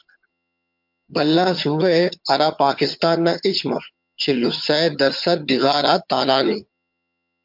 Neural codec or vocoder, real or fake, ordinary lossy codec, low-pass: vocoder, 22.05 kHz, 80 mel bands, HiFi-GAN; fake; MP3, 48 kbps; 5.4 kHz